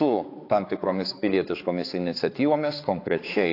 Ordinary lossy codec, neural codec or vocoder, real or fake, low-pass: AAC, 32 kbps; codec, 16 kHz, 4 kbps, X-Codec, HuBERT features, trained on balanced general audio; fake; 5.4 kHz